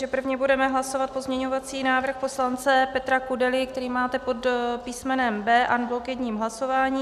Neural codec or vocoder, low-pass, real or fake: none; 14.4 kHz; real